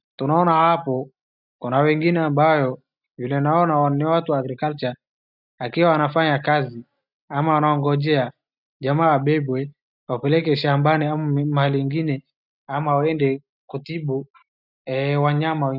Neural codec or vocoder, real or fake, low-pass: none; real; 5.4 kHz